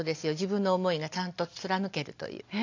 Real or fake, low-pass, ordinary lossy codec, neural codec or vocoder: real; 7.2 kHz; AAC, 48 kbps; none